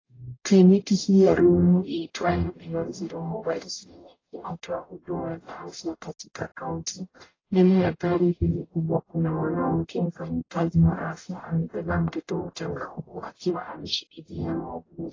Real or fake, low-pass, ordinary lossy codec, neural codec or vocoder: fake; 7.2 kHz; AAC, 32 kbps; codec, 44.1 kHz, 0.9 kbps, DAC